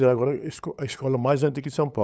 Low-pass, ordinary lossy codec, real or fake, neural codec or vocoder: none; none; fake; codec, 16 kHz, 8 kbps, FunCodec, trained on LibriTTS, 25 frames a second